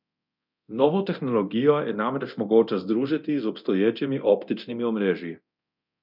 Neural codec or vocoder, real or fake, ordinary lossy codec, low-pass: codec, 24 kHz, 0.9 kbps, DualCodec; fake; none; 5.4 kHz